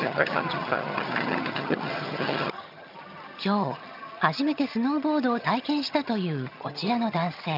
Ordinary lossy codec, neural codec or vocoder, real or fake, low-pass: none; vocoder, 22.05 kHz, 80 mel bands, HiFi-GAN; fake; 5.4 kHz